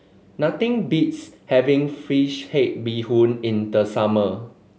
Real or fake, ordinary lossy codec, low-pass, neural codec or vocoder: real; none; none; none